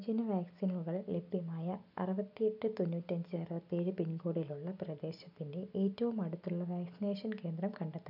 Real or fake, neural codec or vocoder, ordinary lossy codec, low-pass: real; none; none; 5.4 kHz